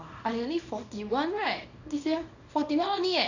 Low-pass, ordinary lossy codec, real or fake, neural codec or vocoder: 7.2 kHz; none; fake; codec, 24 kHz, 0.9 kbps, WavTokenizer, small release